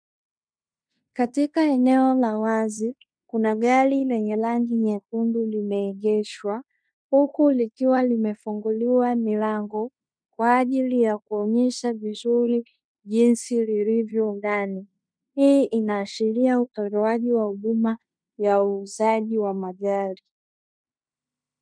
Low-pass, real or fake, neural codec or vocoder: 9.9 kHz; fake; codec, 16 kHz in and 24 kHz out, 0.9 kbps, LongCat-Audio-Codec, fine tuned four codebook decoder